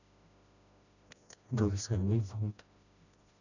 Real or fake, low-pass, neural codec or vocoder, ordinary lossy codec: fake; 7.2 kHz; codec, 16 kHz, 1 kbps, FreqCodec, smaller model; none